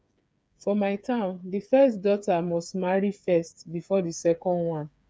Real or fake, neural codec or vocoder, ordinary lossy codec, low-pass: fake; codec, 16 kHz, 8 kbps, FreqCodec, smaller model; none; none